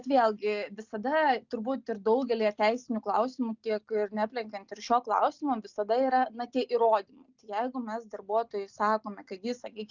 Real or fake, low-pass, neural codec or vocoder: real; 7.2 kHz; none